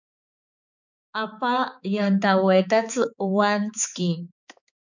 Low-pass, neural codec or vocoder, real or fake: 7.2 kHz; codec, 16 kHz, 4 kbps, X-Codec, HuBERT features, trained on balanced general audio; fake